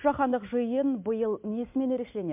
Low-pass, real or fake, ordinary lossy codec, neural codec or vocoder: 3.6 kHz; real; MP3, 32 kbps; none